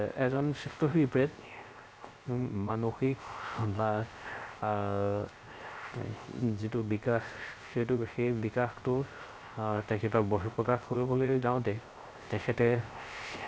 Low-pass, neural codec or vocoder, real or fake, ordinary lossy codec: none; codec, 16 kHz, 0.3 kbps, FocalCodec; fake; none